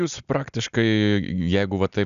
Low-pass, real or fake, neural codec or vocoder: 7.2 kHz; real; none